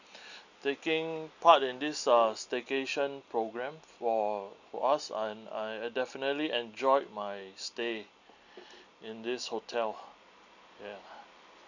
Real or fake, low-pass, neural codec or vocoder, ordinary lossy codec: real; 7.2 kHz; none; none